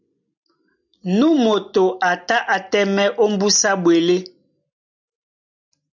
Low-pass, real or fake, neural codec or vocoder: 7.2 kHz; real; none